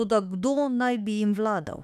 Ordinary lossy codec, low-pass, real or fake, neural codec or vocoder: none; 14.4 kHz; fake; autoencoder, 48 kHz, 32 numbers a frame, DAC-VAE, trained on Japanese speech